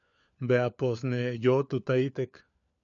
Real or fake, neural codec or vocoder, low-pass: fake; codec, 16 kHz, 4 kbps, FunCodec, trained on LibriTTS, 50 frames a second; 7.2 kHz